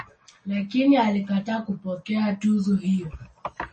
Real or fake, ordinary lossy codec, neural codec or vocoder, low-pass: real; MP3, 32 kbps; none; 10.8 kHz